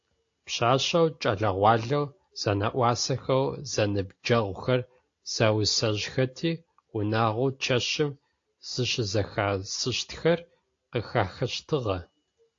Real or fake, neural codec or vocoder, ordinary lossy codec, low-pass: real; none; AAC, 48 kbps; 7.2 kHz